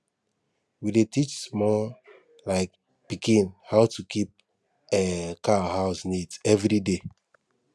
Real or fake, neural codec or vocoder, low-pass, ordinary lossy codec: real; none; none; none